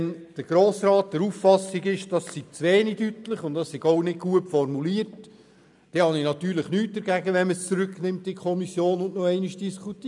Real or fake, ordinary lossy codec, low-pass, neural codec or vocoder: real; none; 10.8 kHz; none